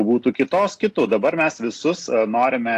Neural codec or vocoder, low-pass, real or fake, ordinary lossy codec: none; 14.4 kHz; real; AAC, 64 kbps